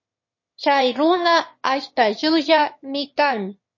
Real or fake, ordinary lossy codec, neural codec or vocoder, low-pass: fake; MP3, 32 kbps; autoencoder, 22.05 kHz, a latent of 192 numbers a frame, VITS, trained on one speaker; 7.2 kHz